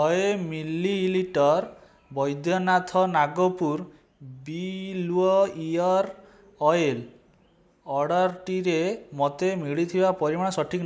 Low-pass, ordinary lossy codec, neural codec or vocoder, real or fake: none; none; none; real